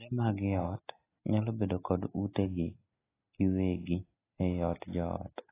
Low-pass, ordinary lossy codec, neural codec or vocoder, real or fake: 3.6 kHz; AAC, 16 kbps; none; real